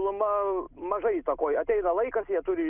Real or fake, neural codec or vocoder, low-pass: real; none; 3.6 kHz